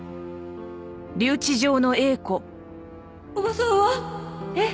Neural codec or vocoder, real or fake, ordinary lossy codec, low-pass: none; real; none; none